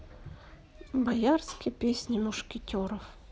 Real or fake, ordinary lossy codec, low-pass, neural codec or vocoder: real; none; none; none